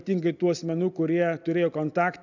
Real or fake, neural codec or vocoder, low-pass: real; none; 7.2 kHz